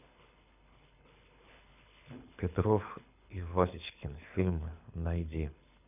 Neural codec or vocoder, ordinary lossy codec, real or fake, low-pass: codec, 24 kHz, 6 kbps, HILCodec; none; fake; 3.6 kHz